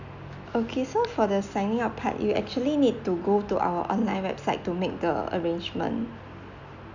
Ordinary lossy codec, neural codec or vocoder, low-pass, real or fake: none; none; 7.2 kHz; real